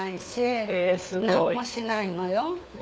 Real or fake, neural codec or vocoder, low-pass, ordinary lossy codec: fake; codec, 16 kHz, 4 kbps, FunCodec, trained on LibriTTS, 50 frames a second; none; none